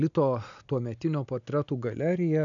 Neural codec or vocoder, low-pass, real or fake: none; 7.2 kHz; real